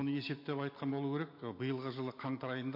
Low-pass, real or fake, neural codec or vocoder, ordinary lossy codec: 5.4 kHz; real; none; MP3, 32 kbps